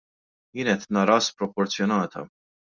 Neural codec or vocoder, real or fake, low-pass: none; real; 7.2 kHz